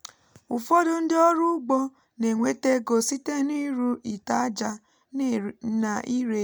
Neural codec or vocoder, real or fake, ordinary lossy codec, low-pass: none; real; none; none